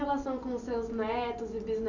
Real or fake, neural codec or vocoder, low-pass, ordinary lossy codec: real; none; 7.2 kHz; none